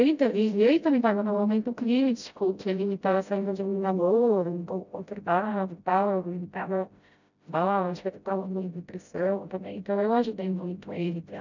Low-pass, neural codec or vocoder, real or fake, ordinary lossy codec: 7.2 kHz; codec, 16 kHz, 0.5 kbps, FreqCodec, smaller model; fake; none